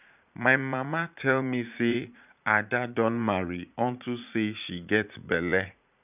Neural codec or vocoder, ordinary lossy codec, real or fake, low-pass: vocoder, 44.1 kHz, 80 mel bands, Vocos; none; fake; 3.6 kHz